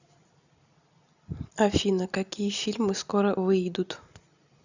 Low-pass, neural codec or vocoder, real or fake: 7.2 kHz; none; real